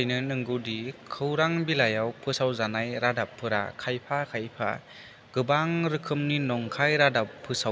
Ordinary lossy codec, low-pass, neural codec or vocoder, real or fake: none; none; none; real